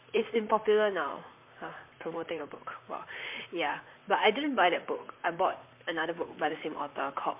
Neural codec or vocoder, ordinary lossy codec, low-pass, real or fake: vocoder, 44.1 kHz, 128 mel bands, Pupu-Vocoder; MP3, 32 kbps; 3.6 kHz; fake